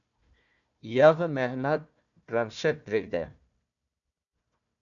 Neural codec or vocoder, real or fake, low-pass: codec, 16 kHz, 1 kbps, FunCodec, trained on Chinese and English, 50 frames a second; fake; 7.2 kHz